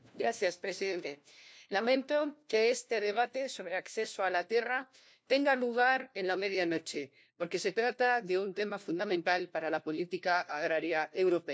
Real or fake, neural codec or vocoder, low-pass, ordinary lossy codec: fake; codec, 16 kHz, 1 kbps, FunCodec, trained on LibriTTS, 50 frames a second; none; none